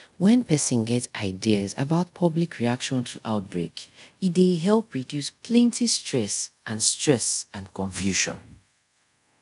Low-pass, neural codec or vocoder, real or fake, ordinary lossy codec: 10.8 kHz; codec, 24 kHz, 0.5 kbps, DualCodec; fake; none